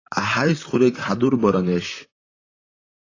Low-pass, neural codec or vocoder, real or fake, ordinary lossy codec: 7.2 kHz; vocoder, 44.1 kHz, 128 mel bands, Pupu-Vocoder; fake; AAC, 32 kbps